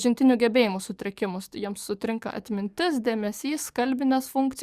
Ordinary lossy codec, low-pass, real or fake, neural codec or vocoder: Opus, 64 kbps; 14.4 kHz; fake; codec, 44.1 kHz, 7.8 kbps, DAC